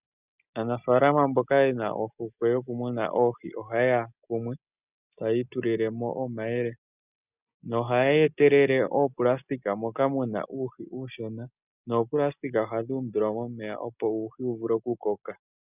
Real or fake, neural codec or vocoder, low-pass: real; none; 3.6 kHz